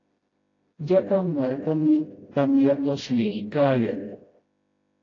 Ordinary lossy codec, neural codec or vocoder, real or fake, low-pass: AAC, 32 kbps; codec, 16 kHz, 0.5 kbps, FreqCodec, smaller model; fake; 7.2 kHz